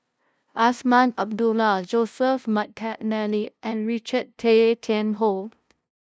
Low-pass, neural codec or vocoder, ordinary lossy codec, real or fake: none; codec, 16 kHz, 0.5 kbps, FunCodec, trained on LibriTTS, 25 frames a second; none; fake